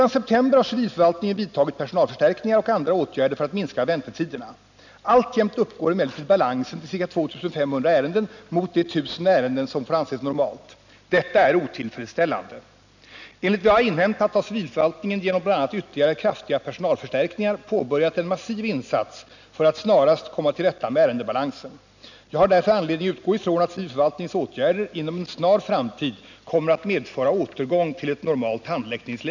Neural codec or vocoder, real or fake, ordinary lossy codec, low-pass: none; real; none; 7.2 kHz